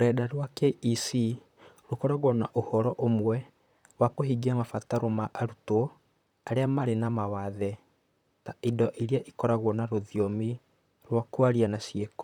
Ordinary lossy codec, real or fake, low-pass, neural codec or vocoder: none; fake; 19.8 kHz; vocoder, 44.1 kHz, 128 mel bands, Pupu-Vocoder